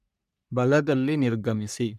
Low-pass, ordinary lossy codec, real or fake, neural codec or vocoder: 14.4 kHz; none; fake; codec, 44.1 kHz, 3.4 kbps, Pupu-Codec